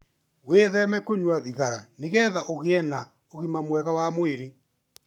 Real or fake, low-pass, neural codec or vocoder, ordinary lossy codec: fake; 19.8 kHz; codec, 44.1 kHz, 7.8 kbps, Pupu-Codec; none